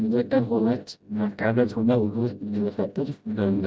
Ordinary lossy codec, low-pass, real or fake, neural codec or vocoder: none; none; fake; codec, 16 kHz, 0.5 kbps, FreqCodec, smaller model